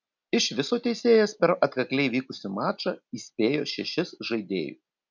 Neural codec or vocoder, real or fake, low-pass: none; real; 7.2 kHz